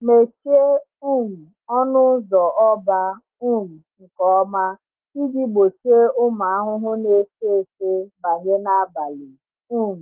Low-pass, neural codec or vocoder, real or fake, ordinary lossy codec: 3.6 kHz; none; real; Opus, 16 kbps